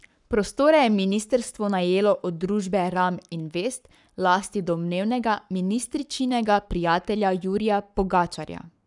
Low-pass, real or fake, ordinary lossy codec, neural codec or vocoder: 10.8 kHz; fake; none; codec, 44.1 kHz, 7.8 kbps, Pupu-Codec